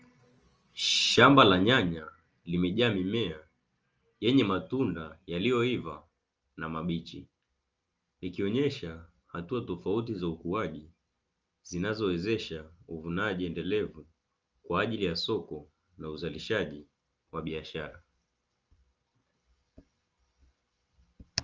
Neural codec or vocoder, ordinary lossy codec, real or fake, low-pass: none; Opus, 24 kbps; real; 7.2 kHz